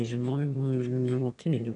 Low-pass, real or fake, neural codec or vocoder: 9.9 kHz; fake; autoencoder, 22.05 kHz, a latent of 192 numbers a frame, VITS, trained on one speaker